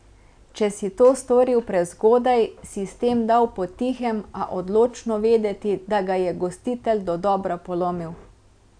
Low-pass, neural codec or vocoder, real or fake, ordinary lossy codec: 9.9 kHz; none; real; none